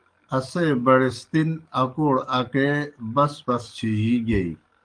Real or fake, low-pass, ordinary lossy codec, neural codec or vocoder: fake; 9.9 kHz; Opus, 32 kbps; codec, 44.1 kHz, 7.8 kbps, DAC